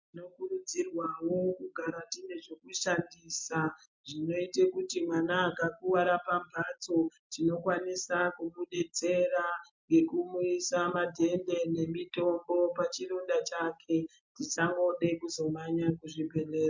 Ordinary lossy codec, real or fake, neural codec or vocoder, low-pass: MP3, 48 kbps; real; none; 7.2 kHz